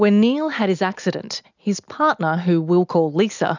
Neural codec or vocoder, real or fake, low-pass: none; real; 7.2 kHz